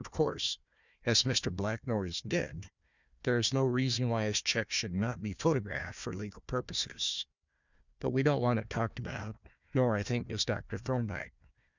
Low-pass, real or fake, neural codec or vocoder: 7.2 kHz; fake; codec, 16 kHz, 1 kbps, FunCodec, trained on Chinese and English, 50 frames a second